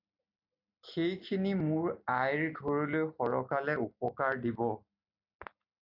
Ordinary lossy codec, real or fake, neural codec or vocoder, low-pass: AAC, 48 kbps; real; none; 5.4 kHz